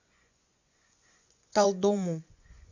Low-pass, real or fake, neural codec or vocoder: 7.2 kHz; fake; vocoder, 24 kHz, 100 mel bands, Vocos